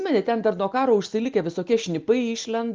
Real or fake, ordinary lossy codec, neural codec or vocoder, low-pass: real; Opus, 24 kbps; none; 7.2 kHz